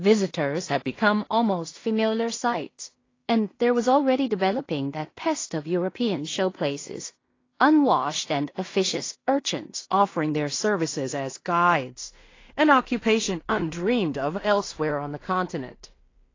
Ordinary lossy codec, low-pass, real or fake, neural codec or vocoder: AAC, 32 kbps; 7.2 kHz; fake; codec, 16 kHz in and 24 kHz out, 0.4 kbps, LongCat-Audio-Codec, two codebook decoder